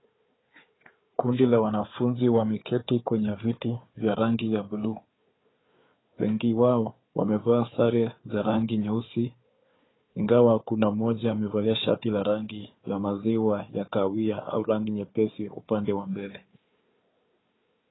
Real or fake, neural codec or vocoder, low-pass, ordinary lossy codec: fake; codec, 16 kHz, 4 kbps, FunCodec, trained on Chinese and English, 50 frames a second; 7.2 kHz; AAC, 16 kbps